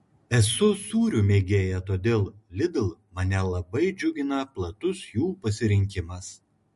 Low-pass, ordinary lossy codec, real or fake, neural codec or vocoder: 14.4 kHz; MP3, 48 kbps; real; none